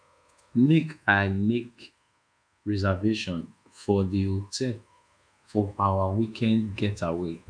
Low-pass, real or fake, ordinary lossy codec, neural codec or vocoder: 9.9 kHz; fake; none; codec, 24 kHz, 1.2 kbps, DualCodec